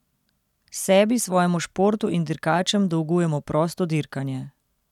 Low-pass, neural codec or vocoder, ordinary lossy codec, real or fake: 19.8 kHz; none; none; real